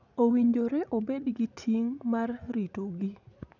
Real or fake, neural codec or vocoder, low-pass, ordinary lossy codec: fake; vocoder, 44.1 kHz, 80 mel bands, Vocos; 7.2 kHz; none